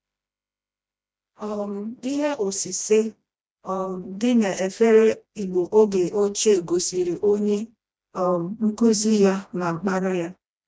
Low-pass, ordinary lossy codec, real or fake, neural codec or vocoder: none; none; fake; codec, 16 kHz, 1 kbps, FreqCodec, smaller model